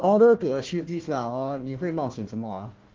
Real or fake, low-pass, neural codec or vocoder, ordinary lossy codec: fake; 7.2 kHz; codec, 16 kHz, 1 kbps, FunCodec, trained on Chinese and English, 50 frames a second; Opus, 16 kbps